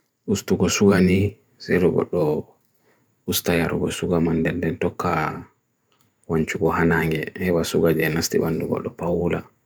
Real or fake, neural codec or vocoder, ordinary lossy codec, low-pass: fake; vocoder, 44.1 kHz, 128 mel bands every 512 samples, BigVGAN v2; none; none